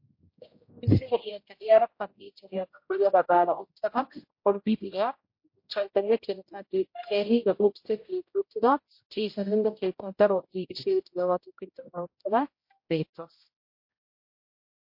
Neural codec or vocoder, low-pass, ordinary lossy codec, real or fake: codec, 16 kHz, 0.5 kbps, X-Codec, HuBERT features, trained on general audio; 5.4 kHz; MP3, 32 kbps; fake